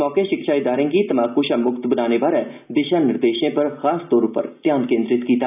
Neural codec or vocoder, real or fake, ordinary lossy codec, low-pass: none; real; none; 3.6 kHz